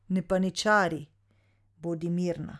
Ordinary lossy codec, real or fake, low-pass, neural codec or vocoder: none; real; none; none